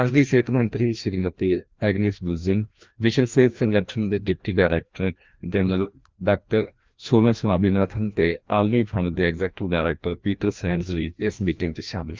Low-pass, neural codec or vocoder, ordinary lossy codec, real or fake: 7.2 kHz; codec, 16 kHz, 1 kbps, FreqCodec, larger model; Opus, 32 kbps; fake